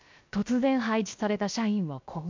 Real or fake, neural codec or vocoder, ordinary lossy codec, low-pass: fake; codec, 16 kHz, 0.7 kbps, FocalCodec; MP3, 64 kbps; 7.2 kHz